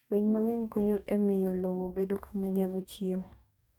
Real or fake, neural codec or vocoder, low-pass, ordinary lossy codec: fake; codec, 44.1 kHz, 2.6 kbps, DAC; 19.8 kHz; none